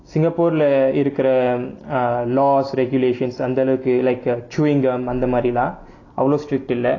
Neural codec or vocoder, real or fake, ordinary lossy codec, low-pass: none; real; AAC, 32 kbps; 7.2 kHz